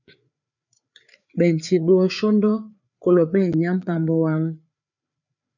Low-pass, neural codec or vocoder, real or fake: 7.2 kHz; codec, 16 kHz, 4 kbps, FreqCodec, larger model; fake